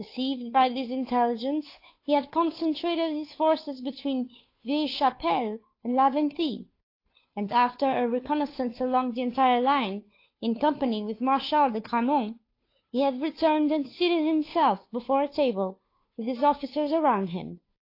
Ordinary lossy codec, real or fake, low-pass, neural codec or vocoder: AAC, 32 kbps; fake; 5.4 kHz; codec, 16 kHz, 2 kbps, FunCodec, trained on Chinese and English, 25 frames a second